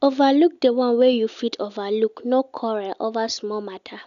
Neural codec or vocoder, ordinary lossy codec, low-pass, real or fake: none; none; 7.2 kHz; real